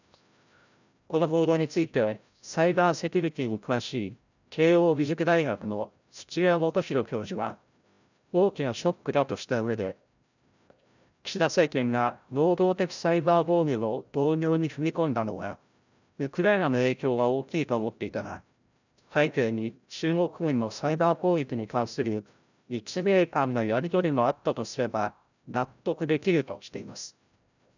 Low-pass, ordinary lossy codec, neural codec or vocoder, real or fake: 7.2 kHz; none; codec, 16 kHz, 0.5 kbps, FreqCodec, larger model; fake